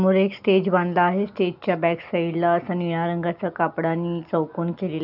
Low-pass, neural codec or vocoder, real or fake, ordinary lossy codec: 5.4 kHz; none; real; none